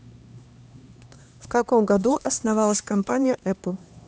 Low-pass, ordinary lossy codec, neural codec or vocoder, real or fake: none; none; codec, 16 kHz, 2 kbps, X-Codec, HuBERT features, trained on LibriSpeech; fake